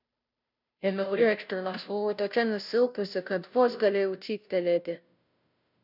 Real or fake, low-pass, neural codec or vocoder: fake; 5.4 kHz; codec, 16 kHz, 0.5 kbps, FunCodec, trained on Chinese and English, 25 frames a second